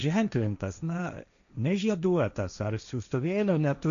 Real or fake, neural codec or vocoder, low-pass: fake; codec, 16 kHz, 1.1 kbps, Voila-Tokenizer; 7.2 kHz